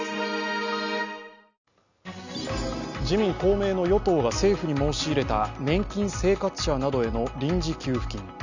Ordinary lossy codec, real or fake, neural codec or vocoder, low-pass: none; real; none; 7.2 kHz